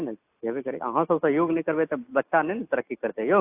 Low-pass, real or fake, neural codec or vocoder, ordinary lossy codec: 3.6 kHz; real; none; AAC, 32 kbps